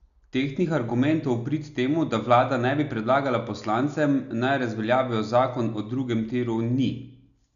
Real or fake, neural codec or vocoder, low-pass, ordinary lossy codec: real; none; 7.2 kHz; none